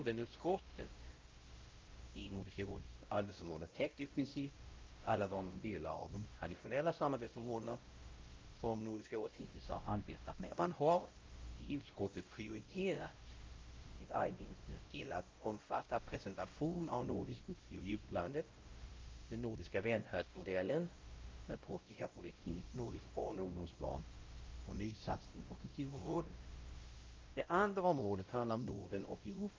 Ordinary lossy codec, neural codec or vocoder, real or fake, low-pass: Opus, 24 kbps; codec, 16 kHz, 0.5 kbps, X-Codec, WavLM features, trained on Multilingual LibriSpeech; fake; 7.2 kHz